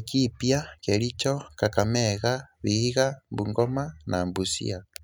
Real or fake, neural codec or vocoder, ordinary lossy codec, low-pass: real; none; none; none